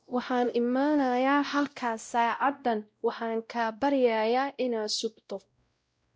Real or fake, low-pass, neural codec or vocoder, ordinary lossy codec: fake; none; codec, 16 kHz, 0.5 kbps, X-Codec, WavLM features, trained on Multilingual LibriSpeech; none